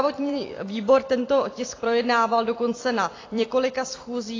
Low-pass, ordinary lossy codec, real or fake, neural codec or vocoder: 7.2 kHz; AAC, 32 kbps; fake; vocoder, 44.1 kHz, 128 mel bands every 256 samples, BigVGAN v2